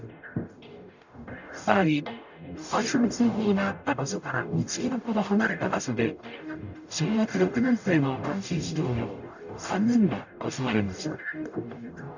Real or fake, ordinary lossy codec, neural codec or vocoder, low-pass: fake; none; codec, 44.1 kHz, 0.9 kbps, DAC; 7.2 kHz